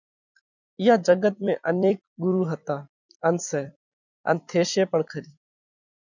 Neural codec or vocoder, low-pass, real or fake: vocoder, 44.1 kHz, 128 mel bands every 256 samples, BigVGAN v2; 7.2 kHz; fake